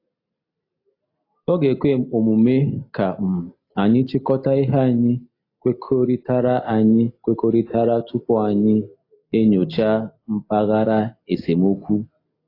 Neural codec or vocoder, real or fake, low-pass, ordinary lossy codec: none; real; 5.4 kHz; AAC, 32 kbps